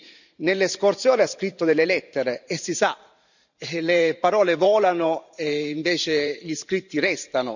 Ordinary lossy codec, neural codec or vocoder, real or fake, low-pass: none; vocoder, 44.1 kHz, 128 mel bands every 512 samples, BigVGAN v2; fake; 7.2 kHz